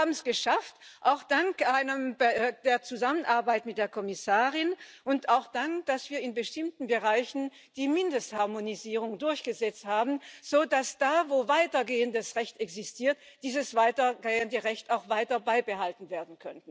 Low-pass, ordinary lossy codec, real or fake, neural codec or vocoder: none; none; real; none